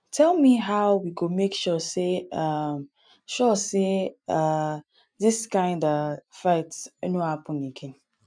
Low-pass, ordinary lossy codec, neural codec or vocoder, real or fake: 9.9 kHz; none; none; real